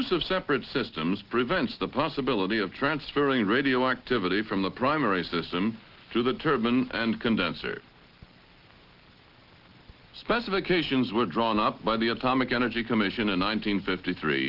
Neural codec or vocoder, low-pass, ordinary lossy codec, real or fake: none; 5.4 kHz; Opus, 16 kbps; real